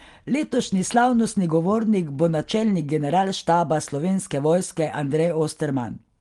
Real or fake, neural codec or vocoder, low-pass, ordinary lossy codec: real; none; 10.8 kHz; Opus, 24 kbps